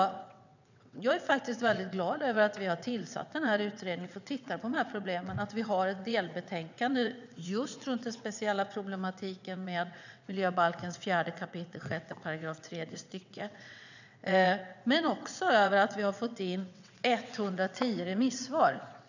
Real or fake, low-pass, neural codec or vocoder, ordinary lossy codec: fake; 7.2 kHz; vocoder, 22.05 kHz, 80 mel bands, WaveNeXt; none